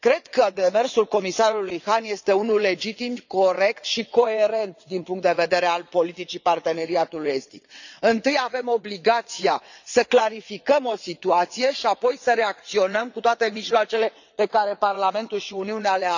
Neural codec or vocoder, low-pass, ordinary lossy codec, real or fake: vocoder, 22.05 kHz, 80 mel bands, WaveNeXt; 7.2 kHz; none; fake